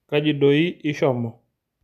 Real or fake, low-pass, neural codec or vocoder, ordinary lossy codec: real; 14.4 kHz; none; none